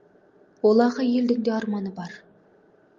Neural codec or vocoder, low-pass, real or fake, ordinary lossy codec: none; 7.2 kHz; real; Opus, 32 kbps